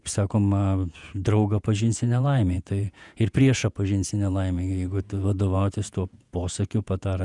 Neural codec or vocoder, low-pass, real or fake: vocoder, 48 kHz, 128 mel bands, Vocos; 10.8 kHz; fake